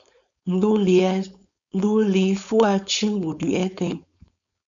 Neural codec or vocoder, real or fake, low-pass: codec, 16 kHz, 4.8 kbps, FACodec; fake; 7.2 kHz